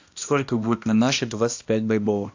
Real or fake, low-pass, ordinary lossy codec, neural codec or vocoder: fake; 7.2 kHz; AAC, 48 kbps; codec, 16 kHz, 1 kbps, X-Codec, HuBERT features, trained on balanced general audio